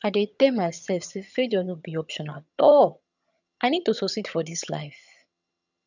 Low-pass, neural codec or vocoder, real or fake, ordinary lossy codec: 7.2 kHz; vocoder, 22.05 kHz, 80 mel bands, HiFi-GAN; fake; none